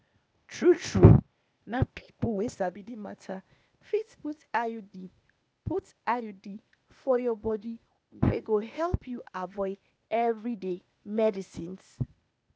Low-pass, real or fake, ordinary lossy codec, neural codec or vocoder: none; fake; none; codec, 16 kHz, 0.8 kbps, ZipCodec